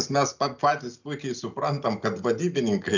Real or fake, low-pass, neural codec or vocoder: real; 7.2 kHz; none